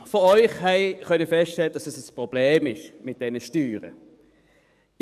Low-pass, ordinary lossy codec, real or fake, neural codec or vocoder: 14.4 kHz; none; fake; codec, 44.1 kHz, 7.8 kbps, DAC